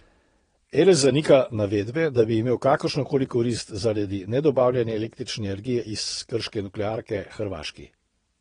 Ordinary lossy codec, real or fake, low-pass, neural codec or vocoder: AAC, 32 kbps; fake; 9.9 kHz; vocoder, 22.05 kHz, 80 mel bands, WaveNeXt